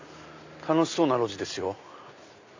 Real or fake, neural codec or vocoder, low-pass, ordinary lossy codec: real; none; 7.2 kHz; AAC, 48 kbps